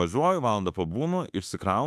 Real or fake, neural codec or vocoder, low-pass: fake; autoencoder, 48 kHz, 32 numbers a frame, DAC-VAE, trained on Japanese speech; 14.4 kHz